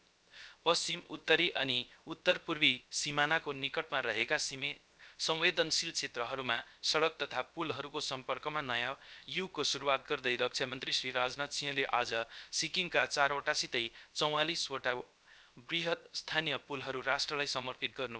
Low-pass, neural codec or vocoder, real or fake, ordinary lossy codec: none; codec, 16 kHz, 0.7 kbps, FocalCodec; fake; none